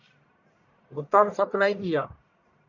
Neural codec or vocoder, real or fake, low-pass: codec, 44.1 kHz, 1.7 kbps, Pupu-Codec; fake; 7.2 kHz